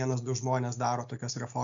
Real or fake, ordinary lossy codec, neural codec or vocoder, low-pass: real; AAC, 64 kbps; none; 7.2 kHz